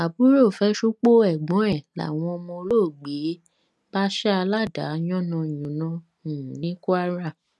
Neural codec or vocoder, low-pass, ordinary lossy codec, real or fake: none; none; none; real